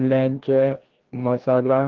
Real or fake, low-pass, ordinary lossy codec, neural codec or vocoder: fake; 7.2 kHz; Opus, 16 kbps; codec, 16 kHz, 1 kbps, FreqCodec, larger model